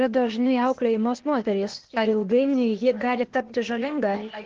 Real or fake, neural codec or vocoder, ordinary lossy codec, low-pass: fake; codec, 16 kHz, 0.8 kbps, ZipCodec; Opus, 16 kbps; 7.2 kHz